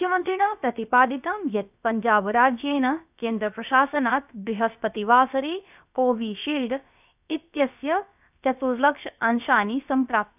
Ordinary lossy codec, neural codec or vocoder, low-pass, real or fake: none; codec, 16 kHz, about 1 kbps, DyCAST, with the encoder's durations; 3.6 kHz; fake